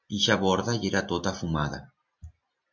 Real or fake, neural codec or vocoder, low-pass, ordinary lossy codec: real; none; 7.2 kHz; MP3, 48 kbps